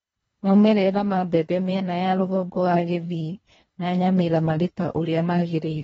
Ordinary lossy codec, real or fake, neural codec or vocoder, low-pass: AAC, 24 kbps; fake; codec, 24 kHz, 1.5 kbps, HILCodec; 10.8 kHz